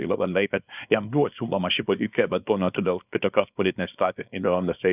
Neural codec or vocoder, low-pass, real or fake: codec, 24 kHz, 0.9 kbps, WavTokenizer, small release; 3.6 kHz; fake